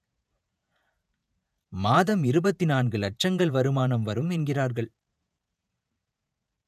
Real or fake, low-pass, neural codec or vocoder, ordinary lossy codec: fake; 14.4 kHz; vocoder, 48 kHz, 128 mel bands, Vocos; none